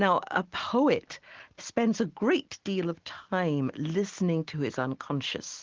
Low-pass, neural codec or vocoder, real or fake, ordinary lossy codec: 7.2 kHz; none; real; Opus, 16 kbps